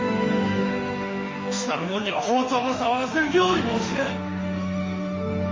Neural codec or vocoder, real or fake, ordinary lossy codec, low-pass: autoencoder, 48 kHz, 32 numbers a frame, DAC-VAE, trained on Japanese speech; fake; MP3, 32 kbps; 7.2 kHz